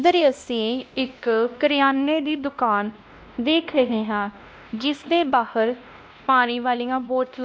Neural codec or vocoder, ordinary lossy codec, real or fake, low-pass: codec, 16 kHz, 1 kbps, X-Codec, WavLM features, trained on Multilingual LibriSpeech; none; fake; none